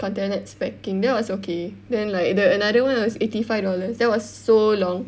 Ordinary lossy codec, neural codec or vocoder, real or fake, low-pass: none; none; real; none